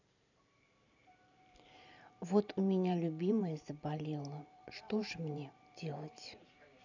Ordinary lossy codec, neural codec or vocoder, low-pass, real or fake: none; vocoder, 44.1 kHz, 128 mel bands every 512 samples, BigVGAN v2; 7.2 kHz; fake